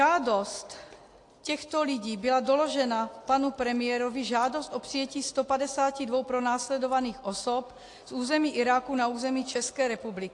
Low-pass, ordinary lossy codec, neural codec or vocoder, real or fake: 10.8 kHz; AAC, 48 kbps; none; real